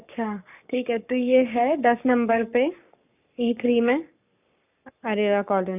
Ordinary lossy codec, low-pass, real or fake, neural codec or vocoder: none; 3.6 kHz; fake; codec, 44.1 kHz, 7.8 kbps, Pupu-Codec